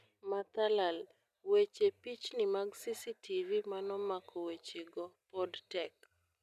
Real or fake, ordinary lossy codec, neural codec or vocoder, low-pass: real; none; none; 14.4 kHz